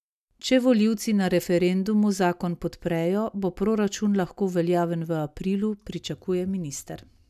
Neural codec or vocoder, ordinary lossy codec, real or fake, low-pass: none; none; real; 14.4 kHz